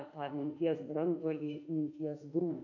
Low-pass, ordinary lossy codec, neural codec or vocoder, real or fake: 7.2 kHz; AAC, 48 kbps; autoencoder, 48 kHz, 32 numbers a frame, DAC-VAE, trained on Japanese speech; fake